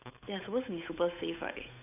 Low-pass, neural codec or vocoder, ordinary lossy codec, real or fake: 3.6 kHz; codec, 16 kHz, 8 kbps, FunCodec, trained on Chinese and English, 25 frames a second; none; fake